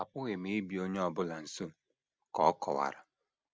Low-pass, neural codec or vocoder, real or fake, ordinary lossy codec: none; none; real; none